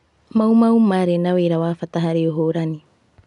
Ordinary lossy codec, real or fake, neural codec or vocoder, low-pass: none; real; none; 10.8 kHz